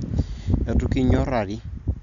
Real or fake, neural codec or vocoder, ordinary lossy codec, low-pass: real; none; none; 7.2 kHz